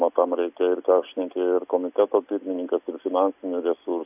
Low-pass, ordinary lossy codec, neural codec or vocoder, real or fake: 3.6 kHz; AAC, 32 kbps; none; real